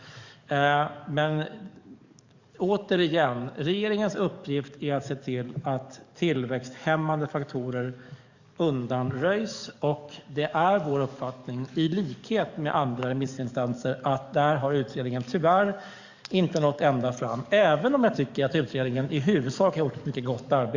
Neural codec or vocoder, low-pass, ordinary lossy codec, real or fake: codec, 44.1 kHz, 7.8 kbps, DAC; 7.2 kHz; Opus, 64 kbps; fake